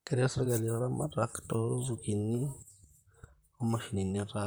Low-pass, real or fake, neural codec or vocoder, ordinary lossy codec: none; fake; vocoder, 44.1 kHz, 128 mel bands, Pupu-Vocoder; none